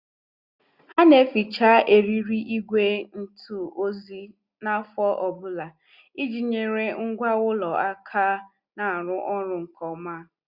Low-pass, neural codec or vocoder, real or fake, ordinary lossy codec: 5.4 kHz; none; real; Opus, 64 kbps